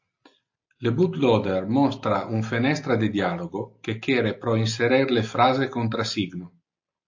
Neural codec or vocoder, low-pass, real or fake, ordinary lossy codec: none; 7.2 kHz; real; AAC, 48 kbps